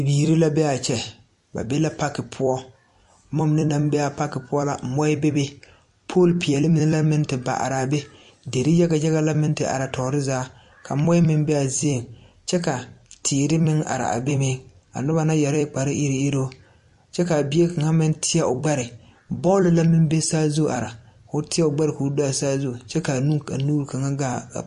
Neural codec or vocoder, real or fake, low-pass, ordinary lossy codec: vocoder, 44.1 kHz, 128 mel bands every 256 samples, BigVGAN v2; fake; 14.4 kHz; MP3, 48 kbps